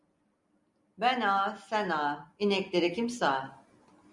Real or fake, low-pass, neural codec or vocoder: real; 10.8 kHz; none